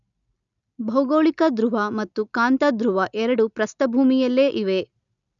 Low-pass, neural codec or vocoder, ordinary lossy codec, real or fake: 7.2 kHz; none; none; real